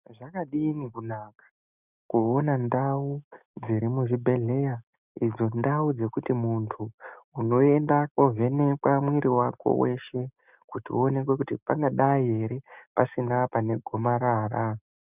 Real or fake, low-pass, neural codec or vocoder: real; 3.6 kHz; none